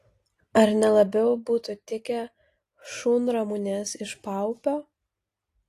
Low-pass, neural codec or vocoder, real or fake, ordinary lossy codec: 14.4 kHz; none; real; AAC, 48 kbps